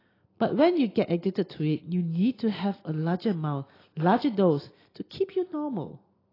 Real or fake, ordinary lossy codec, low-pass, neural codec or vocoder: real; AAC, 24 kbps; 5.4 kHz; none